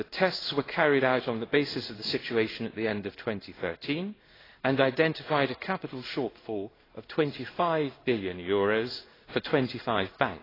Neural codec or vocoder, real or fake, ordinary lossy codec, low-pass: codec, 16 kHz in and 24 kHz out, 1 kbps, XY-Tokenizer; fake; AAC, 24 kbps; 5.4 kHz